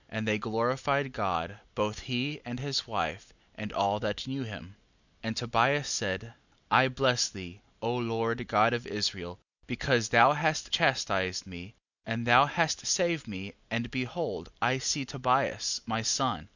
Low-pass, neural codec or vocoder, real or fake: 7.2 kHz; none; real